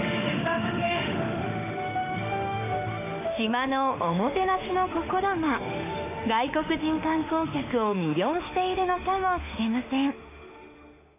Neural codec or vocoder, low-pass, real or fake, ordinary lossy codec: autoencoder, 48 kHz, 32 numbers a frame, DAC-VAE, trained on Japanese speech; 3.6 kHz; fake; none